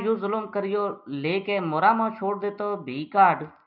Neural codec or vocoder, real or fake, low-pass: none; real; 5.4 kHz